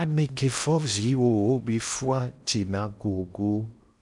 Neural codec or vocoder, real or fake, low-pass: codec, 16 kHz in and 24 kHz out, 0.8 kbps, FocalCodec, streaming, 65536 codes; fake; 10.8 kHz